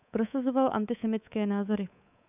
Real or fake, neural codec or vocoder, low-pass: fake; codec, 24 kHz, 3.1 kbps, DualCodec; 3.6 kHz